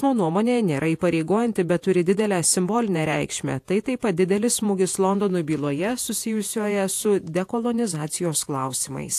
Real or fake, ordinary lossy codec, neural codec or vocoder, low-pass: fake; AAC, 64 kbps; vocoder, 48 kHz, 128 mel bands, Vocos; 14.4 kHz